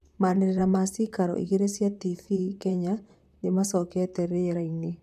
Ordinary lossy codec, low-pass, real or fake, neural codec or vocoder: MP3, 96 kbps; 14.4 kHz; fake; vocoder, 44.1 kHz, 128 mel bands, Pupu-Vocoder